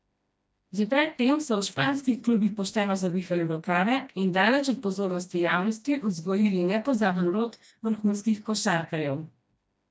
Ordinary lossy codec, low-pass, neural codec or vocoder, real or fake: none; none; codec, 16 kHz, 1 kbps, FreqCodec, smaller model; fake